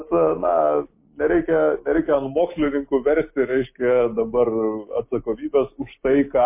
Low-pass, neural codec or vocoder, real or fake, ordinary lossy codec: 3.6 kHz; none; real; MP3, 24 kbps